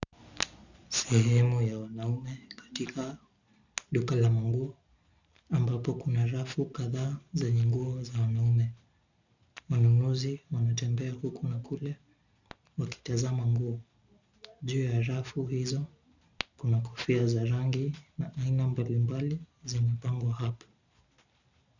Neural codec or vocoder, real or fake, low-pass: none; real; 7.2 kHz